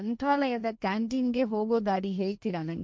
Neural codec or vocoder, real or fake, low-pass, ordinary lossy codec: codec, 16 kHz, 1.1 kbps, Voila-Tokenizer; fake; none; none